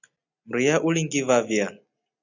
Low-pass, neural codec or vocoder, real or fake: 7.2 kHz; none; real